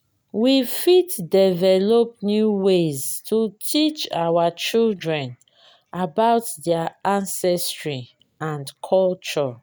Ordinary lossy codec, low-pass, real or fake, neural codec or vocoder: none; none; real; none